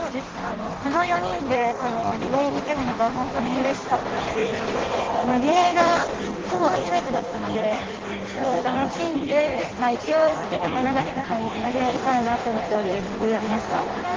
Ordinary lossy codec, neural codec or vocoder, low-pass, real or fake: Opus, 16 kbps; codec, 16 kHz in and 24 kHz out, 0.6 kbps, FireRedTTS-2 codec; 7.2 kHz; fake